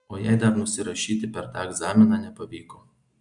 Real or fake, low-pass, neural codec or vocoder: real; 10.8 kHz; none